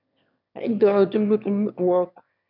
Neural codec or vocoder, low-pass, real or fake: autoencoder, 22.05 kHz, a latent of 192 numbers a frame, VITS, trained on one speaker; 5.4 kHz; fake